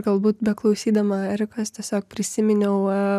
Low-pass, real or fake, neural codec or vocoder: 14.4 kHz; real; none